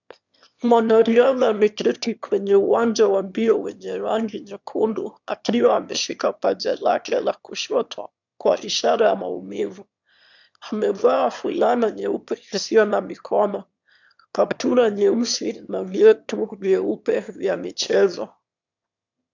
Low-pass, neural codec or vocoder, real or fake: 7.2 kHz; autoencoder, 22.05 kHz, a latent of 192 numbers a frame, VITS, trained on one speaker; fake